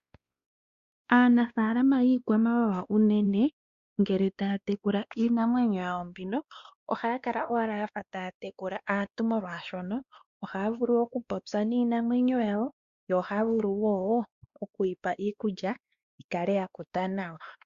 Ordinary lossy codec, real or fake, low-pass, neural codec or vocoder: Opus, 64 kbps; fake; 7.2 kHz; codec, 16 kHz, 2 kbps, X-Codec, WavLM features, trained on Multilingual LibriSpeech